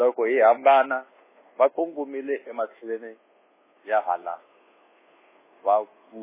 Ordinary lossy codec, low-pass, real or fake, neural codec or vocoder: MP3, 16 kbps; 3.6 kHz; fake; codec, 24 kHz, 1.2 kbps, DualCodec